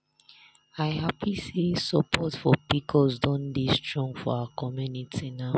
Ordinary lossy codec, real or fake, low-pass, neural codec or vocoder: none; real; none; none